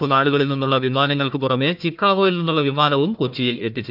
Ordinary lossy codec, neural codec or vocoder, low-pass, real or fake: none; codec, 16 kHz, 1 kbps, FunCodec, trained on Chinese and English, 50 frames a second; 5.4 kHz; fake